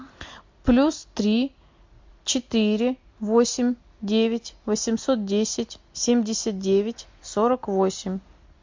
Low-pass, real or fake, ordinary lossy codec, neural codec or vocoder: 7.2 kHz; real; MP3, 48 kbps; none